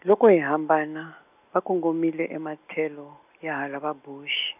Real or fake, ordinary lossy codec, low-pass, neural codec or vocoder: real; none; 3.6 kHz; none